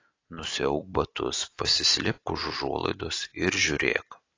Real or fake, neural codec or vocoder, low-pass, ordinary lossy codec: real; none; 7.2 kHz; AAC, 32 kbps